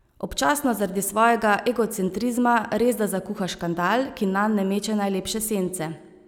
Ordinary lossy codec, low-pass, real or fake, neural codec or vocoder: none; 19.8 kHz; real; none